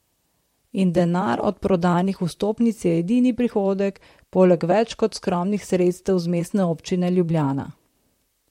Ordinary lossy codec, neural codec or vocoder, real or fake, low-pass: MP3, 64 kbps; vocoder, 44.1 kHz, 128 mel bands, Pupu-Vocoder; fake; 19.8 kHz